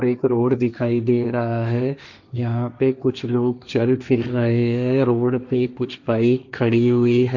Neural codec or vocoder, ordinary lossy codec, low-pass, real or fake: codec, 16 kHz, 1.1 kbps, Voila-Tokenizer; none; 7.2 kHz; fake